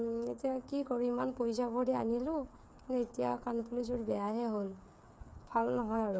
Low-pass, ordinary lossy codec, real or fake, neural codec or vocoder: none; none; fake; codec, 16 kHz, 8 kbps, FreqCodec, smaller model